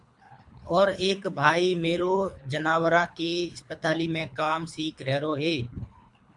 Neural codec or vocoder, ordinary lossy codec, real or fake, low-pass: codec, 24 kHz, 3 kbps, HILCodec; MP3, 64 kbps; fake; 10.8 kHz